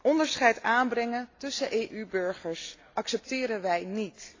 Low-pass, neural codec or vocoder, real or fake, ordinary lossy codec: 7.2 kHz; none; real; AAC, 32 kbps